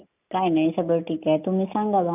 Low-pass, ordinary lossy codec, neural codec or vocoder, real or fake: 3.6 kHz; none; none; real